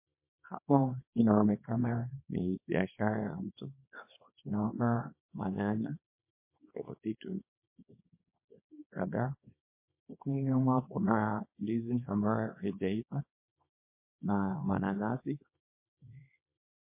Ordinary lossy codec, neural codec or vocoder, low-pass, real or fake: MP3, 24 kbps; codec, 24 kHz, 0.9 kbps, WavTokenizer, small release; 3.6 kHz; fake